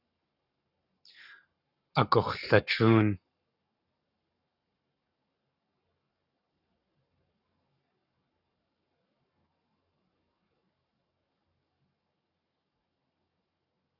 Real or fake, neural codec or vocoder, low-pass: fake; codec, 44.1 kHz, 7.8 kbps, Pupu-Codec; 5.4 kHz